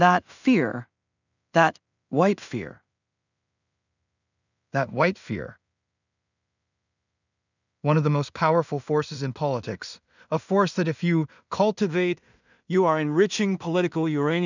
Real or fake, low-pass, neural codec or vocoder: fake; 7.2 kHz; codec, 16 kHz in and 24 kHz out, 0.4 kbps, LongCat-Audio-Codec, two codebook decoder